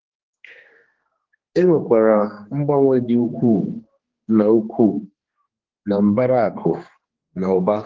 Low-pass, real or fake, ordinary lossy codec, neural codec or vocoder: 7.2 kHz; fake; Opus, 16 kbps; codec, 16 kHz, 2 kbps, X-Codec, HuBERT features, trained on general audio